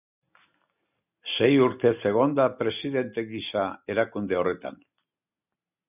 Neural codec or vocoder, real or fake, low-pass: none; real; 3.6 kHz